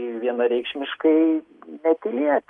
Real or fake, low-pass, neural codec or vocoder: real; 10.8 kHz; none